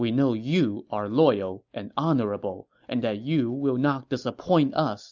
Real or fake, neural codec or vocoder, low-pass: real; none; 7.2 kHz